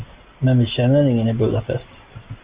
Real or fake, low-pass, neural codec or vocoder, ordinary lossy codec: real; 3.6 kHz; none; Opus, 64 kbps